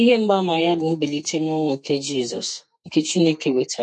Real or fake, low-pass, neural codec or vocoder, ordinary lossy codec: fake; 10.8 kHz; codec, 32 kHz, 1.9 kbps, SNAC; MP3, 48 kbps